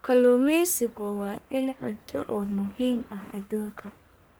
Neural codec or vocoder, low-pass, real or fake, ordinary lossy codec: codec, 44.1 kHz, 1.7 kbps, Pupu-Codec; none; fake; none